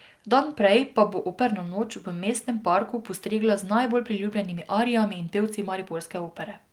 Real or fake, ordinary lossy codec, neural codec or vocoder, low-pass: real; Opus, 24 kbps; none; 19.8 kHz